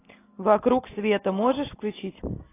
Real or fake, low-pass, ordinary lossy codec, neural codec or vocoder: real; 3.6 kHz; AAC, 16 kbps; none